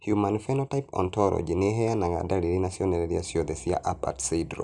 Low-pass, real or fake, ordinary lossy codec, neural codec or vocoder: 10.8 kHz; real; none; none